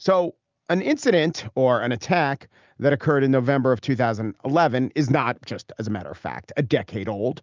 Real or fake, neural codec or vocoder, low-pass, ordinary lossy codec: real; none; 7.2 kHz; Opus, 24 kbps